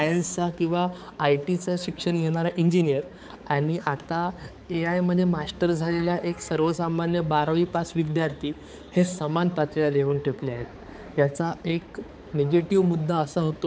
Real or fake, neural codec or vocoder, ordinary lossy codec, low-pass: fake; codec, 16 kHz, 4 kbps, X-Codec, HuBERT features, trained on balanced general audio; none; none